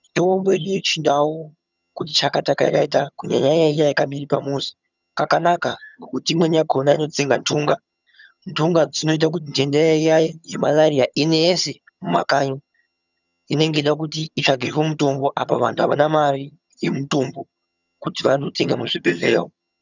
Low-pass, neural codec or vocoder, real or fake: 7.2 kHz; vocoder, 22.05 kHz, 80 mel bands, HiFi-GAN; fake